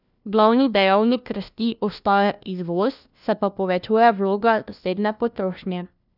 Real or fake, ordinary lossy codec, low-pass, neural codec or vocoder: fake; none; 5.4 kHz; codec, 16 kHz, 1 kbps, FunCodec, trained on LibriTTS, 50 frames a second